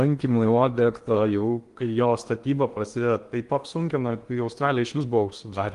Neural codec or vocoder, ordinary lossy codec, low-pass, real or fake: codec, 16 kHz in and 24 kHz out, 0.8 kbps, FocalCodec, streaming, 65536 codes; Opus, 24 kbps; 10.8 kHz; fake